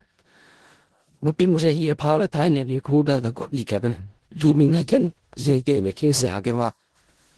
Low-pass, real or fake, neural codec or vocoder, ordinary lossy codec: 10.8 kHz; fake; codec, 16 kHz in and 24 kHz out, 0.4 kbps, LongCat-Audio-Codec, four codebook decoder; Opus, 16 kbps